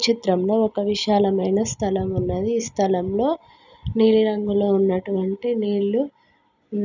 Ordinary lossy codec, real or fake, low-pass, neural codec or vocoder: none; real; 7.2 kHz; none